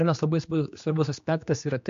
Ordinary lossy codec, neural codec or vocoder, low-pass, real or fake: MP3, 48 kbps; codec, 16 kHz, 2 kbps, X-Codec, HuBERT features, trained on general audio; 7.2 kHz; fake